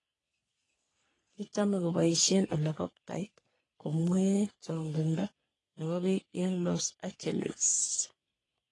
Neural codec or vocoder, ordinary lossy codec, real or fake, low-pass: codec, 44.1 kHz, 3.4 kbps, Pupu-Codec; AAC, 32 kbps; fake; 10.8 kHz